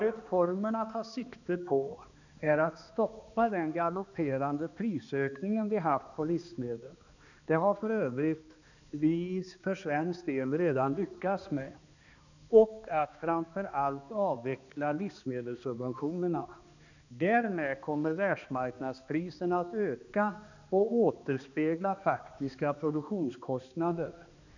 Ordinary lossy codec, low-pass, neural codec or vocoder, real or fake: none; 7.2 kHz; codec, 16 kHz, 2 kbps, X-Codec, HuBERT features, trained on balanced general audio; fake